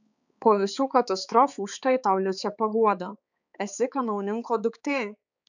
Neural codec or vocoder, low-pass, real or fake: codec, 16 kHz, 4 kbps, X-Codec, HuBERT features, trained on balanced general audio; 7.2 kHz; fake